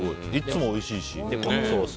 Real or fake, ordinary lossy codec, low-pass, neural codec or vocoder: real; none; none; none